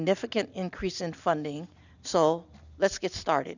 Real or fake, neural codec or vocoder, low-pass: real; none; 7.2 kHz